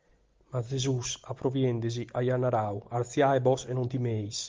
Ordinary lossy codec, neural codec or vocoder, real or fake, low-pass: Opus, 32 kbps; none; real; 7.2 kHz